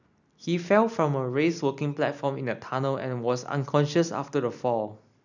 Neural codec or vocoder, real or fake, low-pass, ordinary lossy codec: none; real; 7.2 kHz; none